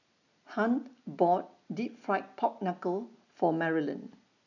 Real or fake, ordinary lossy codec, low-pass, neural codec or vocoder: real; none; 7.2 kHz; none